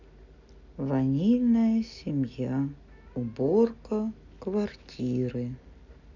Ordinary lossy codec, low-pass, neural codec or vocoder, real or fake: none; 7.2 kHz; none; real